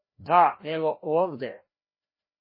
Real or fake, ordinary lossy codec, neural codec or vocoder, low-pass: fake; MP3, 24 kbps; codec, 16 kHz, 1 kbps, FreqCodec, larger model; 5.4 kHz